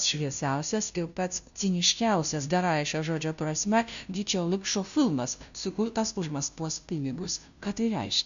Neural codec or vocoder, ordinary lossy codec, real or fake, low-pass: codec, 16 kHz, 0.5 kbps, FunCodec, trained on Chinese and English, 25 frames a second; AAC, 96 kbps; fake; 7.2 kHz